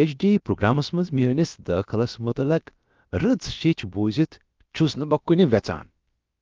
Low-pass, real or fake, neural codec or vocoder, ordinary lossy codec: 7.2 kHz; fake; codec, 16 kHz, about 1 kbps, DyCAST, with the encoder's durations; Opus, 32 kbps